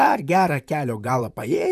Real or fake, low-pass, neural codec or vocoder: fake; 14.4 kHz; vocoder, 44.1 kHz, 128 mel bands, Pupu-Vocoder